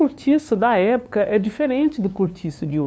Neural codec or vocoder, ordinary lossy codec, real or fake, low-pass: codec, 16 kHz, 2 kbps, FunCodec, trained on LibriTTS, 25 frames a second; none; fake; none